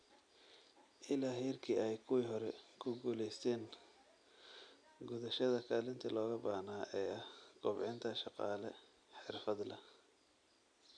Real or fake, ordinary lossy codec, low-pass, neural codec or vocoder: real; none; 9.9 kHz; none